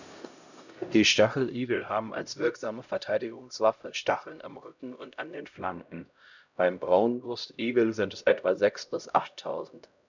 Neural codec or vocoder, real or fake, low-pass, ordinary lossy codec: codec, 16 kHz, 0.5 kbps, X-Codec, HuBERT features, trained on LibriSpeech; fake; 7.2 kHz; none